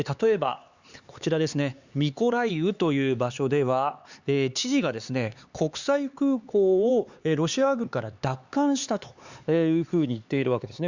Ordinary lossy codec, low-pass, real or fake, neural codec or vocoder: Opus, 64 kbps; 7.2 kHz; fake; codec, 16 kHz, 4 kbps, X-Codec, HuBERT features, trained on LibriSpeech